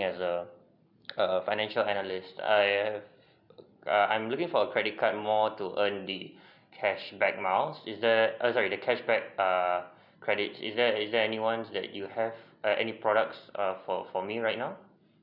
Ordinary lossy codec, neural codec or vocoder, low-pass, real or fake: none; none; 5.4 kHz; real